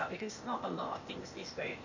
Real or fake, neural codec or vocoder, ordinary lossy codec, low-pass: fake; codec, 16 kHz, 0.8 kbps, ZipCodec; none; 7.2 kHz